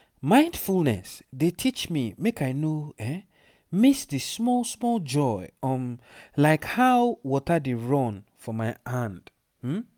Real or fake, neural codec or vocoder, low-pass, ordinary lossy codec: real; none; none; none